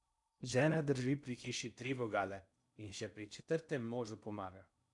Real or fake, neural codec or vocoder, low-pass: fake; codec, 16 kHz in and 24 kHz out, 0.6 kbps, FocalCodec, streaming, 2048 codes; 10.8 kHz